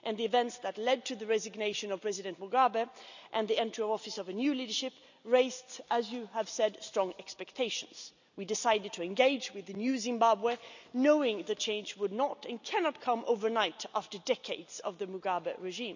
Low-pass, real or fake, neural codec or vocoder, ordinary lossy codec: 7.2 kHz; real; none; none